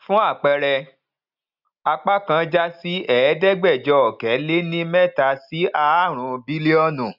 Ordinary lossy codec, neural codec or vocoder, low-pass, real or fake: none; none; 5.4 kHz; real